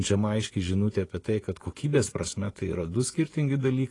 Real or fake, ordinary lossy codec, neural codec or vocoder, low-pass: fake; AAC, 32 kbps; vocoder, 24 kHz, 100 mel bands, Vocos; 10.8 kHz